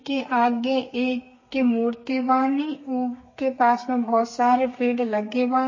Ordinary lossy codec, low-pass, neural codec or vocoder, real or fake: MP3, 32 kbps; 7.2 kHz; codec, 32 kHz, 1.9 kbps, SNAC; fake